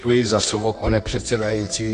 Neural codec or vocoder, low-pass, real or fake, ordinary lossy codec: codec, 24 kHz, 0.9 kbps, WavTokenizer, medium music audio release; 10.8 kHz; fake; AAC, 48 kbps